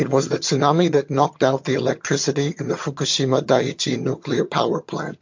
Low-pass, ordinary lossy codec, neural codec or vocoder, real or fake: 7.2 kHz; MP3, 48 kbps; vocoder, 22.05 kHz, 80 mel bands, HiFi-GAN; fake